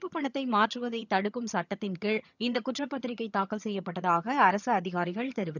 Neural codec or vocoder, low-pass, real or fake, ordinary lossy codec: vocoder, 22.05 kHz, 80 mel bands, HiFi-GAN; 7.2 kHz; fake; none